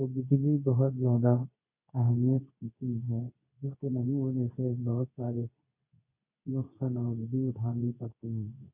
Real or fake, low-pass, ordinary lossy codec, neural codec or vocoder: fake; 3.6 kHz; none; codec, 24 kHz, 0.9 kbps, WavTokenizer, medium speech release version 2